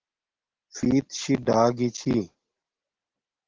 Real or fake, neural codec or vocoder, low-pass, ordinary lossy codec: real; none; 7.2 kHz; Opus, 16 kbps